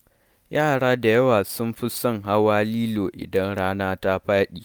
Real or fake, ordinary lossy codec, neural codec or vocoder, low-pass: real; none; none; none